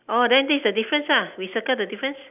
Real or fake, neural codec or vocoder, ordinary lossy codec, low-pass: real; none; none; 3.6 kHz